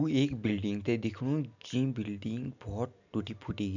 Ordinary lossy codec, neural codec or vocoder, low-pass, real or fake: none; none; 7.2 kHz; real